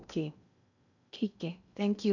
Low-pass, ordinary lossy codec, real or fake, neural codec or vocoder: 7.2 kHz; none; fake; codec, 16 kHz in and 24 kHz out, 0.6 kbps, FocalCodec, streaming, 2048 codes